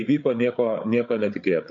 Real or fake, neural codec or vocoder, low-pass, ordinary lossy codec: fake; codec, 16 kHz, 8 kbps, FreqCodec, larger model; 7.2 kHz; MP3, 64 kbps